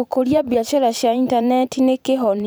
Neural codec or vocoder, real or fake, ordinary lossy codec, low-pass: vocoder, 44.1 kHz, 128 mel bands every 512 samples, BigVGAN v2; fake; none; none